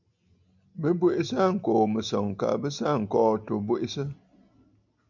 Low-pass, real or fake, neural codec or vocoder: 7.2 kHz; real; none